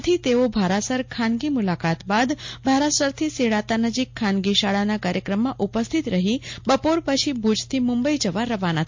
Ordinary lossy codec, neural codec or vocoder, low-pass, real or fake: MP3, 48 kbps; none; 7.2 kHz; real